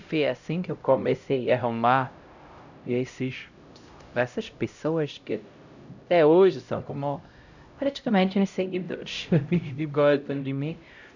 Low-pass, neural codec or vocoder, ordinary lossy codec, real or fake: 7.2 kHz; codec, 16 kHz, 0.5 kbps, X-Codec, HuBERT features, trained on LibriSpeech; none; fake